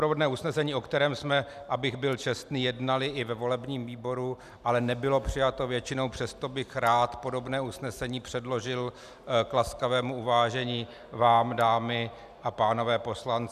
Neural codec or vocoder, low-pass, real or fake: none; 14.4 kHz; real